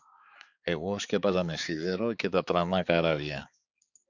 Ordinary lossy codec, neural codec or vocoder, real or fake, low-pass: Opus, 64 kbps; codec, 16 kHz, 4 kbps, X-Codec, HuBERT features, trained on balanced general audio; fake; 7.2 kHz